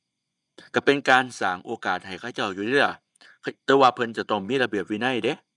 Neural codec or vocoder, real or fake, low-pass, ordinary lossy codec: vocoder, 24 kHz, 100 mel bands, Vocos; fake; 10.8 kHz; none